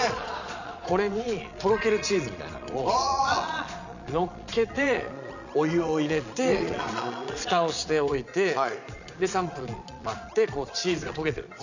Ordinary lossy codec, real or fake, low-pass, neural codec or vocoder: none; fake; 7.2 kHz; vocoder, 22.05 kHz, 80 mel bands, Vocos